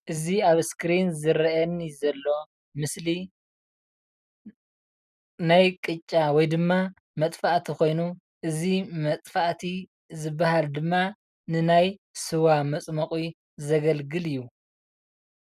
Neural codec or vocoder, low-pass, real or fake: none; 14.4 kHz; real